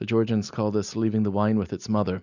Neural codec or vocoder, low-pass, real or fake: none; 7.2 kHz; real